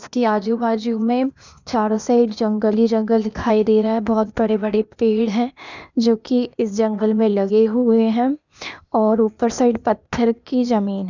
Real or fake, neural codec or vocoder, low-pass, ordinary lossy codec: fake; codec, 16 kHz, 0.8 kbps, ZipCodec; 7.2 kHz; none